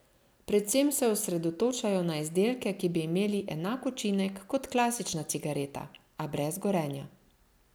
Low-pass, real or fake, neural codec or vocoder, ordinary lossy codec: none; real; none; none